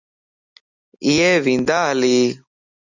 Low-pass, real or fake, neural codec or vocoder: 7.2 kHz; real; none